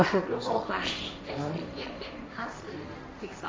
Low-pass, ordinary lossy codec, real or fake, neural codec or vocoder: none; none; fake; codec, 16 kHz, 1.1 kbps, Voila-Tokenizer